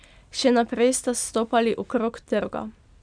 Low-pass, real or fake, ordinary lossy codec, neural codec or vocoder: 9.9 kHz; real; none; none